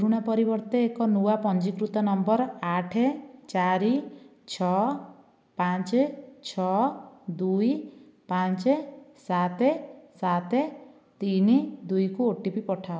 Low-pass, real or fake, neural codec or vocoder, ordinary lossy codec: none; real; none; none